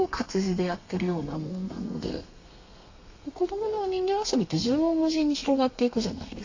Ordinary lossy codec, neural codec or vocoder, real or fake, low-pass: AAC, 48 kbps; codec, 32 kHz, 1.9 kbps, SNAC; fake; 7.2 kHz